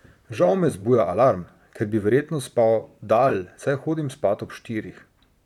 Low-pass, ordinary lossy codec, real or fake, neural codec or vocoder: 19.8 kHz; none; fake; vocoder, 44.1 kHz, 128 mel bands, Pupu-Vocoder